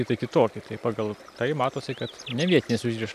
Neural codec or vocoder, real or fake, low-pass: none; real; 14.4 kHz